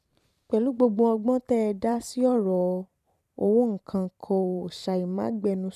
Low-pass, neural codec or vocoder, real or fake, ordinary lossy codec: 14.4 kHz; vocoder, 44.1 kHz, 128 mel bands every 512 samples, BigVGAN v2; fake; none